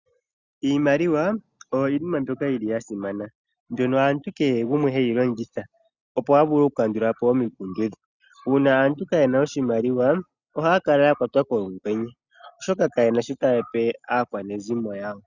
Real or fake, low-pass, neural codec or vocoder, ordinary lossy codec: real; 7.2 kHz; none; Opus, 64 kbps